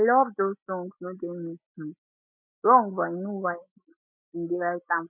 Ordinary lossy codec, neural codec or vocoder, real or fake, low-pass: none; none; real; 3.6 kHz